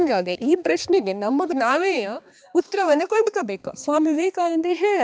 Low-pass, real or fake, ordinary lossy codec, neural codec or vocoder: none; fake; none; codec, 16 kHz, 2 kbps, X-Codec, HuBERT features, trained on balanced general audio